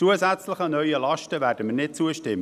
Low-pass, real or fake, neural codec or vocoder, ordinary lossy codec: 14.4 kHz; real; none; none